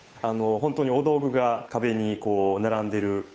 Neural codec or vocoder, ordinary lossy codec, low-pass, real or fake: codec, 16 kHz, 8 kbps, FunCodec, trained on Chinese and English, 25 frames a second; none; none; fake